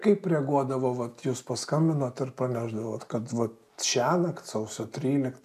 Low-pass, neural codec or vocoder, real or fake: 14.4 kHz; none; real